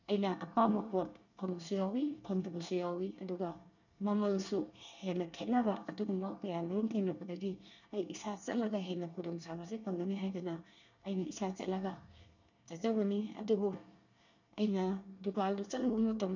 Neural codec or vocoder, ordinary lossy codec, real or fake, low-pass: codec, 24 kHz, 1 kbps, SNAC; none; fake; 7.2 kHz